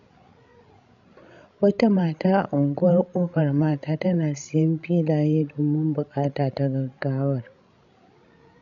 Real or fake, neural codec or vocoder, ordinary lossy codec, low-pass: fake; codec, 16 kHz, 16 kbps, FreqCodec, larger model; none; 7.2 kHz